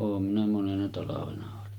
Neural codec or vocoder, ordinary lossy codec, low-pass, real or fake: none; Opus, 32 kbps; 19.8 kHz; real